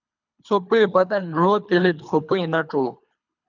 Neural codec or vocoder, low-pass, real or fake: codec, 24 kHz, 3 kbps, HILCodec; 7.2 kHz; fake